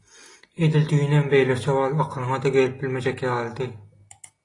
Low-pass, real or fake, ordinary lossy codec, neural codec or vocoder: 10.8 kHz; real; AAC, 32 kbps; none